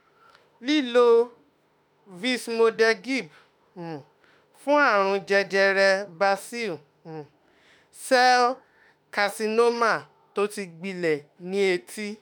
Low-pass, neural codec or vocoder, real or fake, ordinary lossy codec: none; autoencoder, 48 kHz, 32 numbers a frame, DAC-VAE, trained on Japanese speech; fake; none